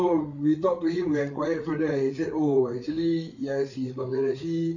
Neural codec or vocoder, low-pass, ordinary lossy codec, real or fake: codec, 16 kHz, 8 kbps, FreqCodec, larger model; 7.2 kHz; none; fake